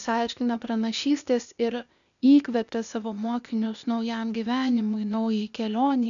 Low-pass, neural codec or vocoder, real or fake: 7.2 kHz; codec, 16 kHz, 0.8 kbps, ZipCodec; fake